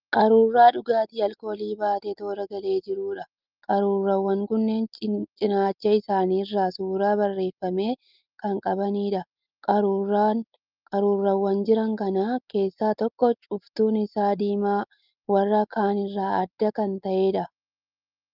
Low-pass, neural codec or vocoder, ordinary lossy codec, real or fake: 5.4 kHz; none; Opus, 32 kbps; real